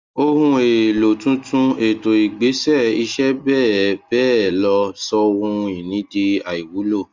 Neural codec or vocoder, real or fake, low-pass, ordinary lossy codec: none; real; 7.2 kHz; Opus, 32 kbps